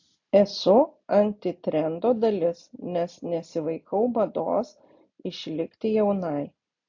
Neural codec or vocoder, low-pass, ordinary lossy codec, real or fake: none; 7.2 kHz; AAC, 48 kbps; real